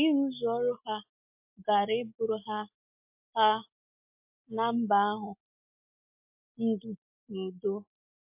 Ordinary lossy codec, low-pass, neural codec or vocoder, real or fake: MP3, 32 kbps; 3.6 kHz; none; real